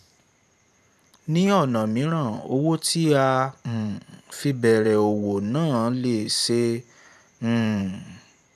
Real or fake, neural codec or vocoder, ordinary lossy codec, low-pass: fake; vocoder, 44.1 kHz, 128 mel bands every 512 samples, BigVGAN v2; none; 14.4 kHz